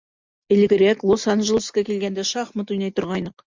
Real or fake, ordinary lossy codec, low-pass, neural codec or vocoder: real; MP3, 64 kbps; 7.2 kHz; none